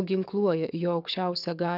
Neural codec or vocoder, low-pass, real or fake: codec, 44.1 kHz, 7.8 kbps, DAC; 5.4 kHz; fake